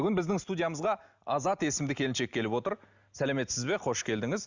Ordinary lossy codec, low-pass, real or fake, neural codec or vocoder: Opus, 64 kbps; 7.2 kHz; real; none